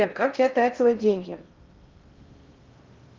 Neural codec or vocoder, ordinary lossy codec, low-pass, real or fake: codec, 16 kHz in and 24 kHz out, 0.6 kbps, FocalCodec, streaming, 4096 codes; Opus, 24 kbps; 7.2 kHz; fake